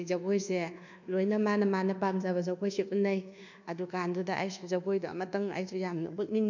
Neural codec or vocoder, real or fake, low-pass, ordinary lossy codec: codec, 24 kHz, 1.2 kbps, DualCodec; fake; 7.2 kHz; none